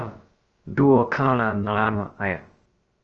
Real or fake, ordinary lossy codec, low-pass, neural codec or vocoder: fake; Opus, 24 kbps; 7.2 kHz; codec, 16 kHz, about 1 kbps, DyCAST, with the encoder's durations